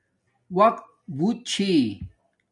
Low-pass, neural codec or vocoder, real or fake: 10.8 kHz; none; real